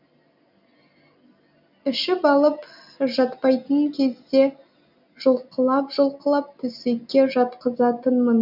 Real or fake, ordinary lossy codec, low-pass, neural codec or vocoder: real; none; 5.4 kHz; none